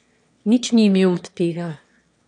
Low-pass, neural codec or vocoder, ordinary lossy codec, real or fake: 9.9 kHz; autoencoder, 22.05 kHz, a latent of 192 numbers a frame, VITS, trained on one speaker; none; fake